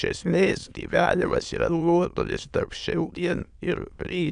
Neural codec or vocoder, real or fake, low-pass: autoencoder, 22.05 kHz, a latent of 192 numbers a frame, VITS, trained on many speakers; fake; 9.9 kHz